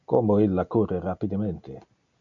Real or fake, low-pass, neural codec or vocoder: real; 7.2 kHz; none